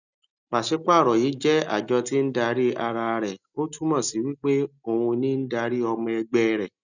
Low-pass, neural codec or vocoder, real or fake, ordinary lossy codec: 7.2 kHz; none; real; none